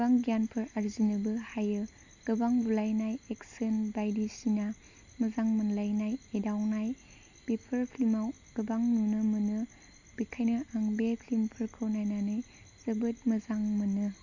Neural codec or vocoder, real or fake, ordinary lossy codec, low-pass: none; real; Opus, 64 kbps; 7.2 kHz